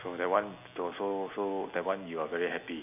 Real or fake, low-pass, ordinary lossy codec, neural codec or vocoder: real; 3.6 kHz; none; none